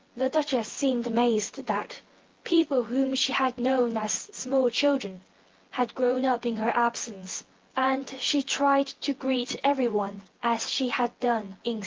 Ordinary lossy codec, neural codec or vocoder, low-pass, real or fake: Opus, 16 kbps; vocoder, 24 kHz, 100 mel bands, Vocos; 7.2 kHz; fake